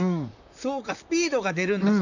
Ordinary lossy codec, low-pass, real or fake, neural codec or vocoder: none; 7.2 kHz; fake; vocoder, 44.1 kHz, 128 mel bands, Pupu-Vocoder